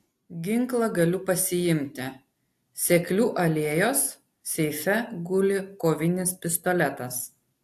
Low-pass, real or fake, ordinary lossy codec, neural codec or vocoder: 14.4 kHz; real; AAC, 64 kbps; none